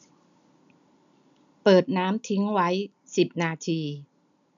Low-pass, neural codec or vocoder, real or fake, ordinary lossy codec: 7.2 kHz; none; real; none